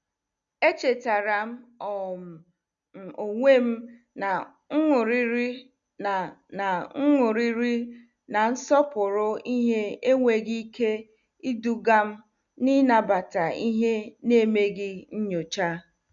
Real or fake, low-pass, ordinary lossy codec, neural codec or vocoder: real; 7.2 kHz; none; none